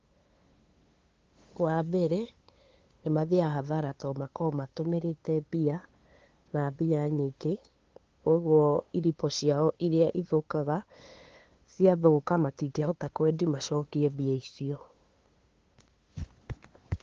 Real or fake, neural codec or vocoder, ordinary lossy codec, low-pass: fake; codec, 16 kHz, 2 kbps, FunCodec, trained on LibriTTS, 25 frames a second; Opus, 16 kbps; 7.2 kHz